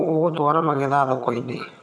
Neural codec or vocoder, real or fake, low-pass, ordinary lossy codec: vocoder, 22.05 kHz, 80 mel bands, HiFi-GAN; fake; none; none